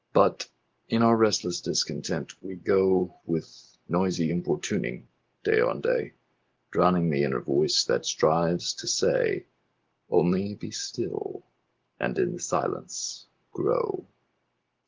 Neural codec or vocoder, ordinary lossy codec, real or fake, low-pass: none; Opus, 16 kbps; real; 7.2 kHz